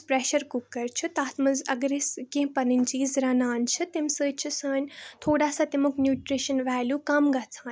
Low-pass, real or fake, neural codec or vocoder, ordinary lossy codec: none; real; none; none